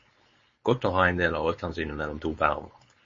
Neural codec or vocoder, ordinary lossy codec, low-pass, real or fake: codec, 16 kHz, 4.8 kbps, FACodec; MP3, 32 kbps; 7.2 kHz; fake